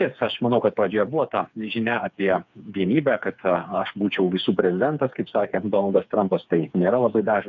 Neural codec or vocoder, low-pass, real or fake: codec, 16 kHz, 4 kbps, FreqCodec, smaller model; 7.2 kHz; fake